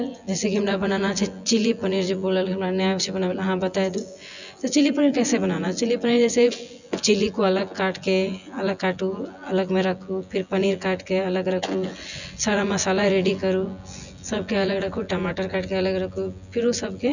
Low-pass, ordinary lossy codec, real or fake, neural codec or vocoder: 7.2 kHz; none; fake; vocoder, 24 kHz, 100 mel bands, Vocos